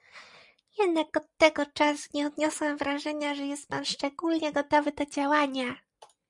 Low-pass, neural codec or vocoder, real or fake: 10.8 kHz; none; real